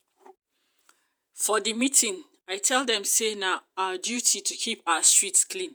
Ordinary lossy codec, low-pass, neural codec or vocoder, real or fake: none; none; vocoder, 48 kHz, 128 mel bands, Vocos; fake